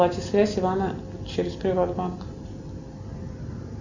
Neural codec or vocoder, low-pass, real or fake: none; 7.2 kHz; real